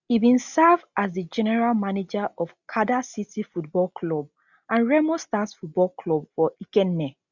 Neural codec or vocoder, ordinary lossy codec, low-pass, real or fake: none; none; 7.2 kHz; real